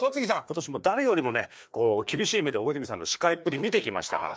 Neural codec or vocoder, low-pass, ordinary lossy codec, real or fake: codec, 16 kHz, 2 kbps, FreqCodec, larger model; none; none; fake